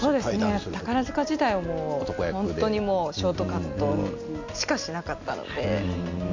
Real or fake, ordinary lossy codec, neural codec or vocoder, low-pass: real; MP3, 48 kbps; none; 7.2 kHz